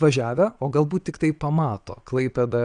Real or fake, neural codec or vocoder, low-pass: fake; vocoder, 22.05 kHz, 80 mel bands, Vocos; 9.9 kHz